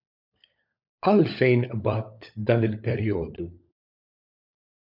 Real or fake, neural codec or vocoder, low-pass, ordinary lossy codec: fake; codec, 16 kHz, 16 kbps, FunCodec, trained on LibriTTS, 50 frames a second; 5.4 kHz; MP3, 48 kbps